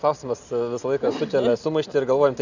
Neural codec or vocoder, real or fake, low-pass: none; real; 7.2 kHz